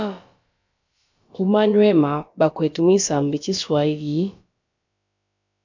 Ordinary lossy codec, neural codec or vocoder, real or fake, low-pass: MP3, 64 kbps; codec, 16 kHz, about 1 kbps, DyCAST, with the encoder's durations; fake; 7.2 kHz